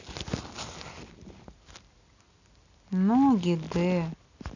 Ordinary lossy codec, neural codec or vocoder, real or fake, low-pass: none; none; real; 7.2 kHz